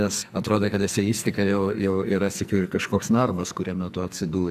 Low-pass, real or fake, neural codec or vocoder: 14.4 kHz; fake; codec, 44.1 kHz, 2.6 kbps, SNAC